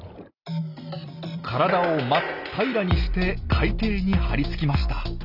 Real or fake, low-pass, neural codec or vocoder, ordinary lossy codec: real; 5.4 kHz; none; none